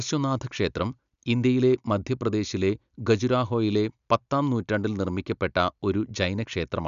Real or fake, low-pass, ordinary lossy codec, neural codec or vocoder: real; 7.2 kHz; none; none